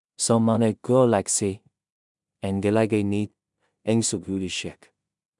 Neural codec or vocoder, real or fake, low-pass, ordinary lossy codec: codec, 16 kHz in and 24 kHz out, 0.4 kbps, LongCat-Audio-Codec, two codebook decoder; fake; 10.8 kHz; MP3, 96 kbps